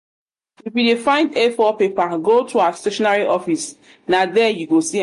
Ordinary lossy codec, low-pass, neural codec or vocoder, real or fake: MP3, 48 kbps; 14.4 kHz; none; real